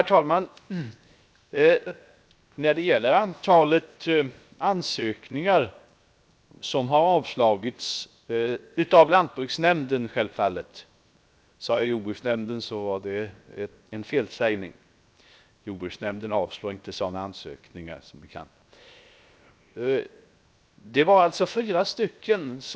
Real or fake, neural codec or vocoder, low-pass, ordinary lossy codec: fake; codec, 16 kHz, 0.7 kbps, FocalCodec; none; none